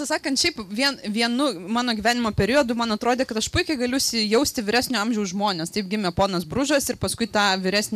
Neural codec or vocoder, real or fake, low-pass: none; real; 14.4 kHz